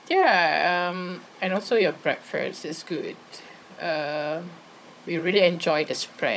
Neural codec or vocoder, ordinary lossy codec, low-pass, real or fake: codec, 16 kHz, 16 kbps, FunCodec, trained on Chinese and English, 50 frames a second; none; none; fake